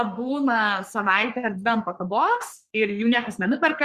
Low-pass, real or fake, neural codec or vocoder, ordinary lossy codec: 14.4 kHz; fake; codec, 44.1 kHz, 3.4 kbps, Pupu-Codec; Opus, 64 kbps